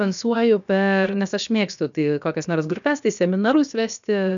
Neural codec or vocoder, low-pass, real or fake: codec, 16 kHz, about 1 kbps, DyCAST, with the encoder's durations; 7.2 kHz; fake